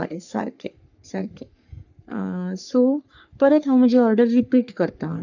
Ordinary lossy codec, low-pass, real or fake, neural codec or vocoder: none; 7.2 kHz; fake; codec, 44.1 kHz, 3.4 kbps, Pupu-Codec